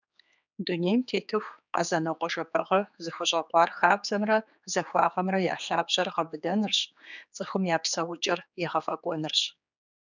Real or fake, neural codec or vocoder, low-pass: fake; codec, 16 kHz, 4 kbps, X-Codec, HuBERT features, trained on general audio; 7.2 kHz